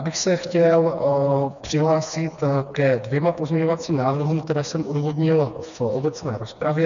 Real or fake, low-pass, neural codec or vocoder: fake; 7.2 kHz; codec, 16 kHz, 2 kbps, FreqCodec, smaller model